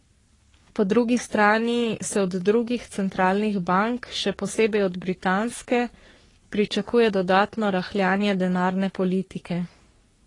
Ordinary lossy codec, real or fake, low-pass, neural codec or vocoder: AAC, 32 kbps; fake; 10.8 kHz; codec, 44.1 kHz, 3.4 kbps, Pupu-Codec